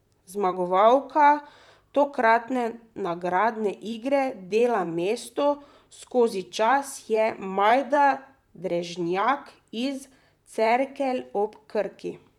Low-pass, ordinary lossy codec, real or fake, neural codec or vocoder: 19.8 kHz; none; fake; vocoder, 44.1 kHz, 128 mel bands, Pupu-Vocoder